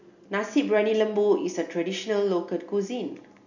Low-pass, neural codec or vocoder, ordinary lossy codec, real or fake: 7.2 kHz; none; none; real